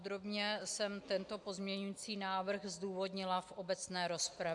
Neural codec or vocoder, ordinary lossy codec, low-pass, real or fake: none; AAC, 64 kbps; 10.8 kHz; real